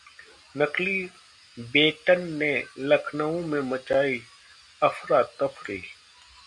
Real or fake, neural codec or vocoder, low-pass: real; none; 10.8 kHz